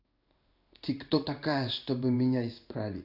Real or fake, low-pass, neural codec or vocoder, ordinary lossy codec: fake; 5.4 kHz; codec, 16 kHz in and 24 kHz out, 1 kbps, XY-Tokenizer; none